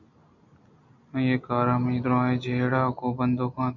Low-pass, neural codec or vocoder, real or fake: 7.2 kHz; none; real